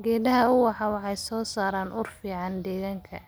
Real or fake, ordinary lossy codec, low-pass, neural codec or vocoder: real; none; none; none